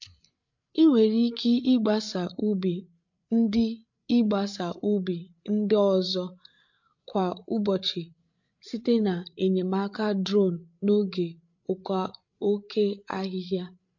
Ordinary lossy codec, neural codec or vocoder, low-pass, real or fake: MP3, 48 kbps; codec, 16 kHz, 8 kbps, FreqCodec, larger model; 7.2 kHz; fake